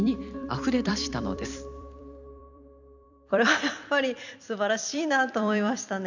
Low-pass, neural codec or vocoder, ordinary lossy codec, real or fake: 7.2 kHz; vocoder, 44.1 kHz, 128 mel bands every 256 samples, BigVGAN v2; none; fake